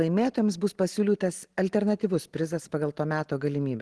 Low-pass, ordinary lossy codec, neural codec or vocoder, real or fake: 10.8 kHz; Opus, 16 kbps; none; real